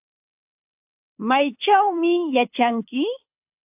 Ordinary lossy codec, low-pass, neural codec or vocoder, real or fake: AAC, 32 kbps; 3.6 kHz; codec, 24 kHz, 6 kbps, HILCodec; fake